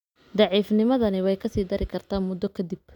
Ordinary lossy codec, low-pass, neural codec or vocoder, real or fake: none; 19.8 kHz; none; real